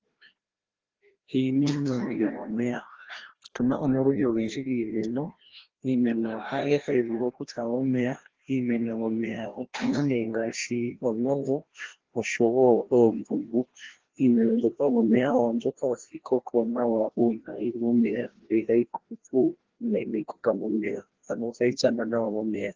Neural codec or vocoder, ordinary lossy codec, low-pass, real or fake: codec, 16 kHz, 1 kbps, FreqCodec, larger model; Opus, 16 kbps; 7.2 kHz; fake